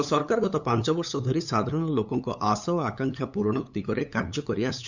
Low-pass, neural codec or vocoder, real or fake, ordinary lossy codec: 7.2 kHz; codec, 16 kHz, 8 kbps, FunCodec, trained on LibriTTS, 25 frames a second; fake; none